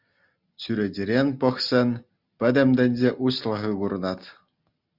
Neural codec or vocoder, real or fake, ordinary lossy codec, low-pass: none; real; Opus, 64 kbps; 5.4 kHz